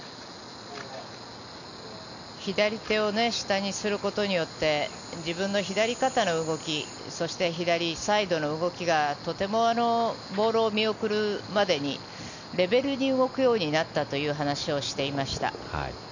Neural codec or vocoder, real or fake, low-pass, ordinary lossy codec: none; real; 7.2 kHz; none